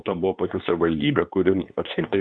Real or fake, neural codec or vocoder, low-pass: fake; codec, 24 kHz, 0.9 kbps, WavTokenizer, medium speech release version 2; 9.9 kHz